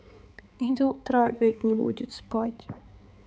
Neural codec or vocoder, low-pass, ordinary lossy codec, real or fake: codec, 16 kHz, 4 kbps, X-Codec, HuBERT features, trained on balanced general audio; none; none; fake